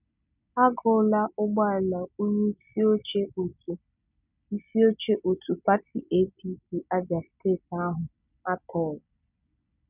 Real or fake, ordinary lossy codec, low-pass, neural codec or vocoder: real; none; 3.6 kHz; none